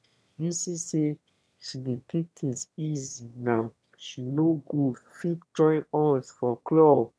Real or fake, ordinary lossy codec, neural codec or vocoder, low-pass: fake; none; autoencoder, 22.05 kHz, a latent of 192 numbers a frame, VITS, trained on one speaker; none